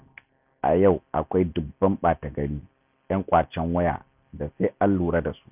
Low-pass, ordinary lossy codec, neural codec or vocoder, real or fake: 3.6 kHz; none; none; real